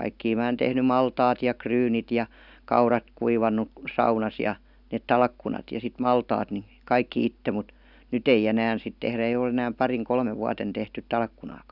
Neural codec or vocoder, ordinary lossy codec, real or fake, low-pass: none; none; real; 5.4 kHz